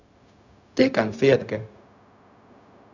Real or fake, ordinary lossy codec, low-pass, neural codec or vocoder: fake; none; 7.2 kHz; codec, 16 kHz, 0.4 kbps, LongCat-Audio-Codec